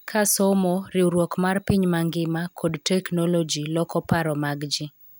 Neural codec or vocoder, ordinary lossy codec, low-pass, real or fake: none; none; none; real